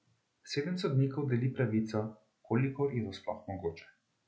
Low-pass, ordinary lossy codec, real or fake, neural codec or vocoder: none; none; real; none